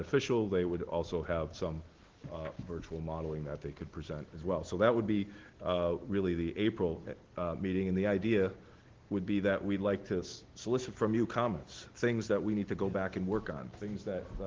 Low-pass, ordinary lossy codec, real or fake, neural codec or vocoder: 7.2 kHz; Opus, 16 kbps; real; none